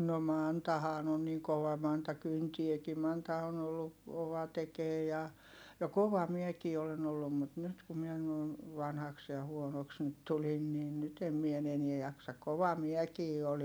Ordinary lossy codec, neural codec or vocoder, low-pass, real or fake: none; none; none; real